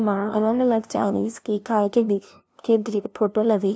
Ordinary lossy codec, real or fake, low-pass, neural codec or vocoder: none; fake; none; codec, 16 kHz, 0.5 kbps, FunCodec, trained on LibriTTS, 25 frames a second